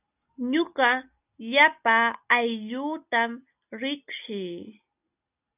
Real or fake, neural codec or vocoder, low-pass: real; none; 3.6 kHz